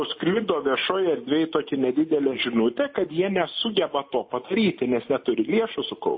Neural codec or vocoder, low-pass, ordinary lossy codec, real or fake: none; 7.2 kHz; MP3, 24 kbps; real